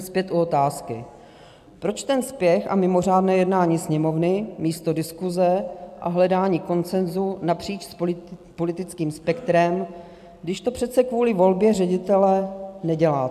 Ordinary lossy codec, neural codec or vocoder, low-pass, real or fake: AAC, 96 kbps; none; 14.4 kHz; real